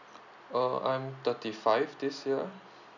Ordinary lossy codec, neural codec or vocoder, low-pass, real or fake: none; none; 7.2 kHz; real